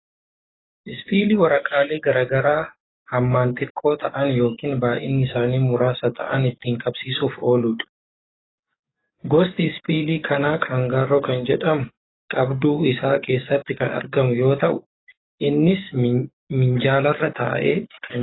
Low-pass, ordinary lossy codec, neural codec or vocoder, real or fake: 7.2 kHz; AAC, 16 kbps; codec, 44.1 kHz, 7.8 kbps, DAC; fake